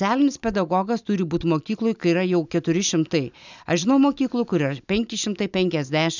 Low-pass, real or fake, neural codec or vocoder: 7.2 kHz; real; none